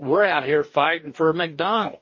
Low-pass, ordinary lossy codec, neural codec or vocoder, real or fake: 7.2 kHz; MP3, 32 kbps; codec, 44.1 kHz, 2.6 kbps, DAC; fake